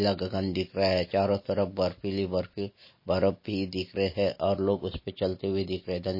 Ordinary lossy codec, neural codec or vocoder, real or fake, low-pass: MP3, 24 kbps; none; real; 5.4 kHz